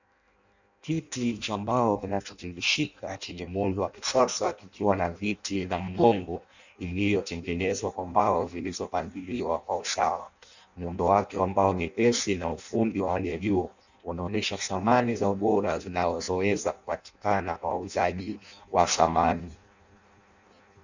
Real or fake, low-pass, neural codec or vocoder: fake; 7.2 kHz; codec, 16 kHz in and 24 kHz out, 0.6 kbps, FireRedTTS-2 codec